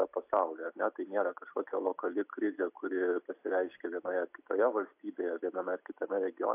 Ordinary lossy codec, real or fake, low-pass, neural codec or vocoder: AAC, 32 kbps; real; 3.6 kHz; none